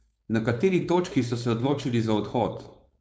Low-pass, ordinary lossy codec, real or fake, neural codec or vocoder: none; none; fake; codec, 16 kHz, 4.8 kbps, FACodec